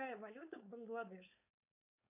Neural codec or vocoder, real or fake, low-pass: codec, 16 kHz, 4.8 kbps, FACodec; fake; 3.6 kHz